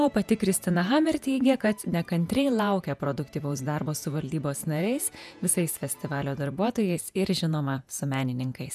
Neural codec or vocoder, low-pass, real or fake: vocoder, 48 kHz, 128 mel bands, Vocos; 14.4 kHz; fake